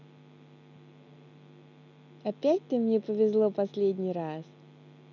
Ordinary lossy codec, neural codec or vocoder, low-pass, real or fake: none; none; 7.2 kHz; real